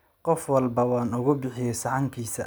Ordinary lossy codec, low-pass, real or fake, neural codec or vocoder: none; none; real; none